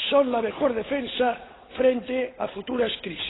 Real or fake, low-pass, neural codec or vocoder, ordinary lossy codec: fake; 7.2 kHz; codec, 16 kHz, 8 kbps, FunCodec, trained on Chinese and English, 25 frames a second; AAC, 16 kbps